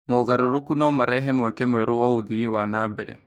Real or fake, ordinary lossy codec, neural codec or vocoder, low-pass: fake; none; codec, 44.1 kHz, 2.6 kbps, DAC; 19.8 kHz